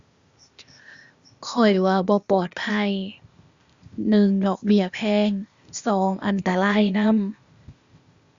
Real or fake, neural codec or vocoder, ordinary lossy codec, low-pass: fake; codec, 16 kHz, 0.8 kbps, ZipCodec; Opus, 64 kbps; 7.2 kHz